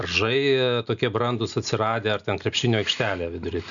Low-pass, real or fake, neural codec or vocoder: 7.2 kHz; real; none